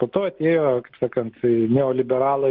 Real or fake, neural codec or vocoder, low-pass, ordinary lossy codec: real; none; 5.4 kHz; Opus, 16 kbps